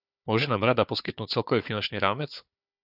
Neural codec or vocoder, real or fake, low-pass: codec, 16 kHz, 4 kbps, FunCodec, trained on Chinese and English, 50 frames a second; fake; 5.4 kHz